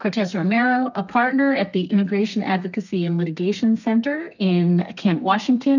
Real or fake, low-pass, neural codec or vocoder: fake; 7.2 kHz; codec, 32 kHz, 1.9 kbps, SNAC